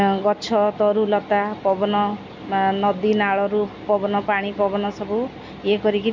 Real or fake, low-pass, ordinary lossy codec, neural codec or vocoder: real; 7.2 kHz; MP3, 64 kbps; none